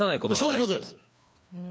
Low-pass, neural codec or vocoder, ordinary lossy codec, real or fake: none; codec, 16 kHz, 2 kbps, FreqCodec, larger model; none; fake